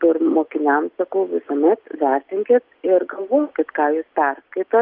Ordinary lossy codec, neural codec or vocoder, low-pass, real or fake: Opus, 32 kbps; none; 5.4 kHz; real